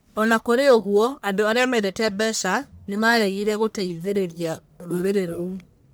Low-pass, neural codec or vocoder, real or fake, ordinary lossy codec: none; codec, 44.1 kHz, 1.7 kbps, Pupu-Codec; fake; none